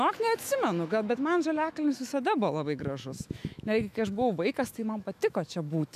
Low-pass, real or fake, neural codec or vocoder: 14.4 kHz; fake; autoencoder, 48 kHz, 128 numbers a frame, DAC-VAE, trained on Japanese speech